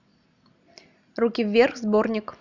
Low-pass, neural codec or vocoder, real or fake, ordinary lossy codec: 7.2 kHz; none; real; none